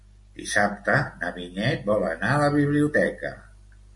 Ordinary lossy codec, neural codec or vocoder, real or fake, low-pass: MP3, 48 kbps; none; real; 10.8 kHz